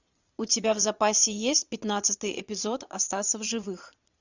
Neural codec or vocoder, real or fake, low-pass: vocoder, 22.05 kHz, 80 mel bands, Vocos; fake; 7.2 kHz